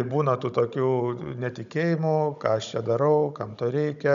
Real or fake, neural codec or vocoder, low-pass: fake; codec, 16 kHz, 16 kbps, FunCodec, trained on Chinese and English, 50 frames a second; 7.2 kHz